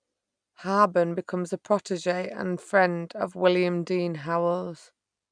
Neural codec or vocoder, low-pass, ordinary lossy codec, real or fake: none; 9.9 kHz; none; real